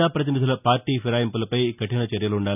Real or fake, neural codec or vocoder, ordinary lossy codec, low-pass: real; none; none; 3.6 kHz